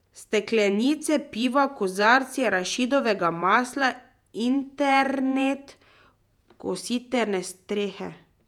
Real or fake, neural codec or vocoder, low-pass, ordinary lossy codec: fake; vocoder, 48 kHz, 128 mel bands, Vocos; 19.8 kHz; none